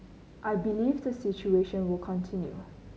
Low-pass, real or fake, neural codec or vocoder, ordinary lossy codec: none; real; none; none